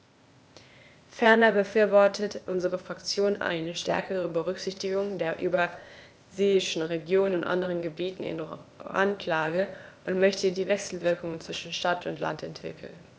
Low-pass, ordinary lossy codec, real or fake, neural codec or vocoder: none; none; fake; codec, 16 kHz, 0.8 kbps, ZipCodec